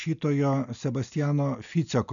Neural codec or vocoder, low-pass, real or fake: none; 7.2 kHz; real